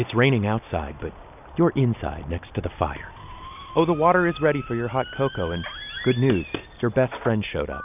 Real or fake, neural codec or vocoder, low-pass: real; none; 3.6 kHz